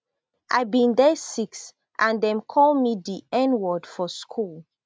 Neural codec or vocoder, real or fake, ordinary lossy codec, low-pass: none; real; none; none